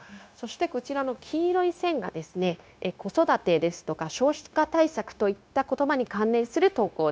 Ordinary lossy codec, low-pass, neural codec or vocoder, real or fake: none; none; codec, 16 kHz, 0.9 kbps, LongCat-Audio-Codec; fake